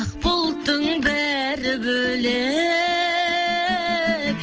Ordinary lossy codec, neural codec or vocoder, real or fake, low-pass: Opus, 16 kbps; none; real; 7.2 kHz